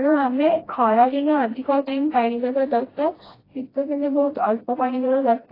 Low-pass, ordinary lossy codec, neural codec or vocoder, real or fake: 5.4 kHz; AAC, 24 kbps; codec, 16 kHz, 1 kbps, FreqCodec, smaller model; fake